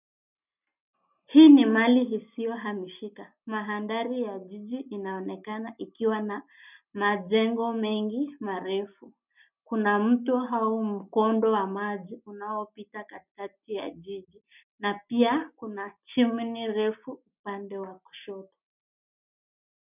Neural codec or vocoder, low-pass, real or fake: none; 3.6 kHz; real